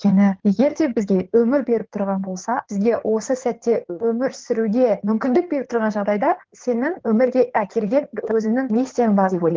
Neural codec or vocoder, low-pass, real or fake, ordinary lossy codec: codec, 16 kHz in and 24 kHz out, 2.2 kbps, FireRedTTS-2 codec; 7.2 kHz; fake; Opus, 16 kbps